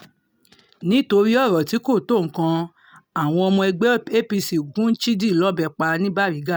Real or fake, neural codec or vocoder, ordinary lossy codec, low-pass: real; none; none; none